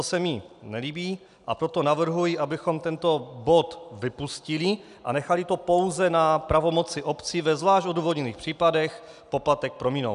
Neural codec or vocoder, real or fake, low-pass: none; real; 10.8 kHz